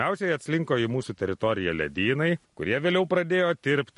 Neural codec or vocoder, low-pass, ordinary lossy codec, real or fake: none; 10.8 kHz; MP3, 48 kbps; real